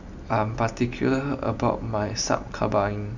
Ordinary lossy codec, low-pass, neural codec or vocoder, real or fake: none; 7.2 kHz; none; real